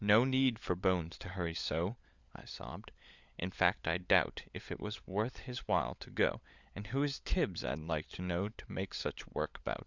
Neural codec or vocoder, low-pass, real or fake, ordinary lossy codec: codec, 16 kHz, 16 kbps, FunCodec, trained on LibriTTS, 50 frames a second; 7.2 kHz; fake; Opus, 64 kbps